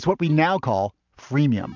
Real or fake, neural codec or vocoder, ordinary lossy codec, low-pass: real; none; MP3, 64 kbps; 7.2 kHz